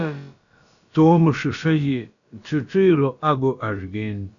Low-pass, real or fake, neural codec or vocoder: 7.2 kHz; fake; codec, 16 kHz, about 1 kbps, DyCAST, with the encoder's durations